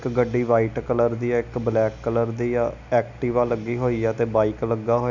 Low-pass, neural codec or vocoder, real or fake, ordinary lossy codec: 7.2 kHz; none; real; none